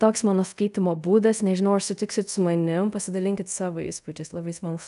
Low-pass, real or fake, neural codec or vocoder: 10.8 kHz; fake; codec, 24 kHz, 0.5 kbps, DualCodec